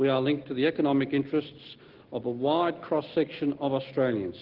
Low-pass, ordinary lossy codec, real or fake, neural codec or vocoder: 5.4 kHz; Opus, 16 kbps; real; none